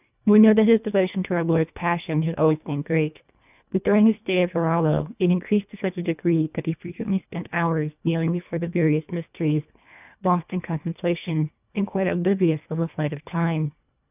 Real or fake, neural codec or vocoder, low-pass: fake; codec, 24 kHz, 1.5 kbps, HILCodec; 3.6 kHz